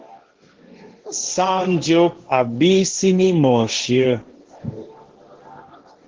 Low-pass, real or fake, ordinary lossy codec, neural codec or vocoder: 7.2 kHz; fake; Opus, 16 kbps; codec, 16 kHz, 1.1 kbps, Voila-Tokenizer